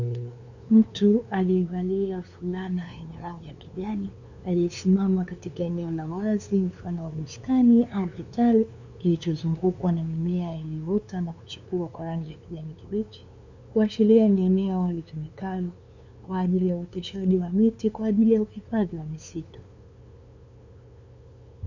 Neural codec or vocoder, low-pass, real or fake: codec, 16 kHz, 2 kbps, FunCodec, trained on LibriTTS, 25 frames a second; 7.2 kHz; fake